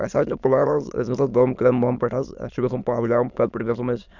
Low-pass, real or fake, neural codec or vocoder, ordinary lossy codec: 7.2 kHz; fake; autoencoder, 22.05 kHz, a latent of 192 numbers a frame, VITS, trained on many speakers; none